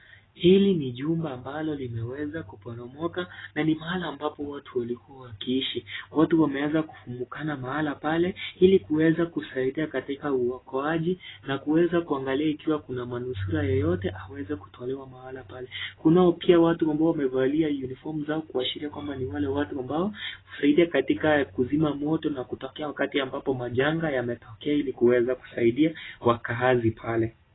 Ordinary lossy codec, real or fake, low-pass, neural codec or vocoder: AAC, 16 kbps; real; 7.2 kHz; none